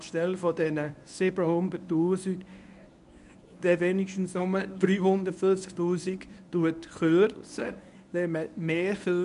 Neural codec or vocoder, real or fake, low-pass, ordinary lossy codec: codec, 24 kHz, 0.9 kbps, WavTokenizer, medium speech release version 1; fake; 10.8 kHz; none